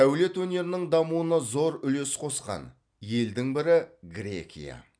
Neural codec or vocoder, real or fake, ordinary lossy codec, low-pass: none; real; MP3, 96 kbps; 9.9 kHz